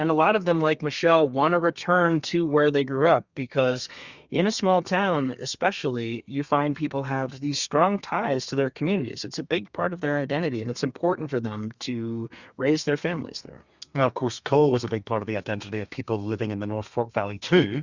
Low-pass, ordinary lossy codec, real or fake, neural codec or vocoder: 7.2 kHz; Opus, 64 kbps; fake; codec, 32 kHz, 1.9 kbps, SNAC